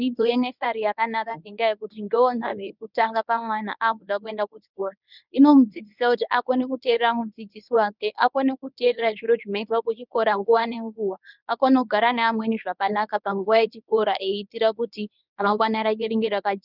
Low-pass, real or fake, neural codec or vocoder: 5.4 kHz; fake; codec, 24 kHz, 0.9 kbps, WavTokenizer, medium speech release version 1